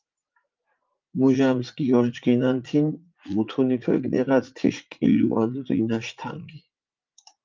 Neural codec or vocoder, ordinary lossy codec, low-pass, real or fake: vocoder, 44.1 kHz, 80 mel bands, Vocos; Opus, 24 kbps; 7.2 kHz; fake